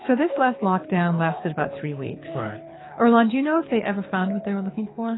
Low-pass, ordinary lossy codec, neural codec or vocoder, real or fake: 7.2 kHz; AAC, 16 kbps; codec, 24 kHz, 6 kbps, HILCodec; fake